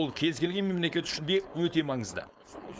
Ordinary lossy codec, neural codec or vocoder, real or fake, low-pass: none; codec, 16 kHz, 4.8 kbps, FACodec; fake; none